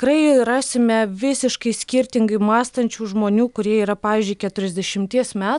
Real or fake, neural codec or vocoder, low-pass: real; none; 10.8 kHz